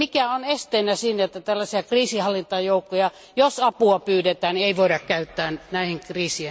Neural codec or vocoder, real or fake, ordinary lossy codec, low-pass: none; real; none; none